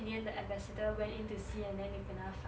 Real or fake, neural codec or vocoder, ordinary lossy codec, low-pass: real; none; none; none